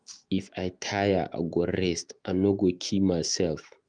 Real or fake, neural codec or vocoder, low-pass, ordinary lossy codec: fake; autoencoder, 48 kHz, 128 numbers a frame, DAC-VAE, trained on Japanese speech; 9.9 kHz; Opus, 32 kbps